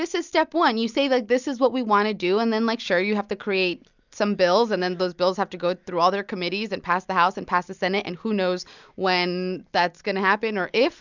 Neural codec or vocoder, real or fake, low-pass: none; real; 7.2 kHz